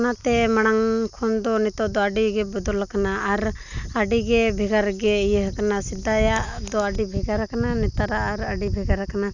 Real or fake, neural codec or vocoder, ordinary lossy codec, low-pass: real; none; none; 7.2 kHz